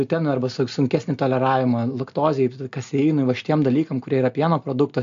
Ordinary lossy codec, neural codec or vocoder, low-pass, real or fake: AAC, 64 kbps; none; 7.2 kHz; real